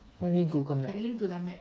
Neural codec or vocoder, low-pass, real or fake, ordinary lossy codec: codec, 16 kHz, 4 kbps, FreqCodec, smaller model; none; fake; none